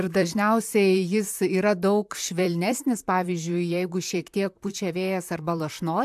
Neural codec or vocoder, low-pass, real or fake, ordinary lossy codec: vocoder, 44.1 kHz, 128 mel bands, Pupu-Vocoder; 14.4 kHz; fake; AAC, 96 kbps